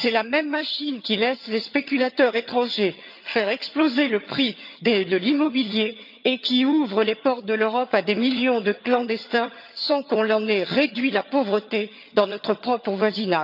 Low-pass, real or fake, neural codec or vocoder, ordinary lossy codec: 5.4 kHz; fake; vocoder, 22.05 kHz, 80 mel bands, HiFi-GAN; none